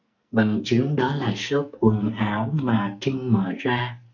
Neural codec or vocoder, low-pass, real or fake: codec, 44.1 kHz, 2.6 kbps, SNAC; 7.2 kHz; fake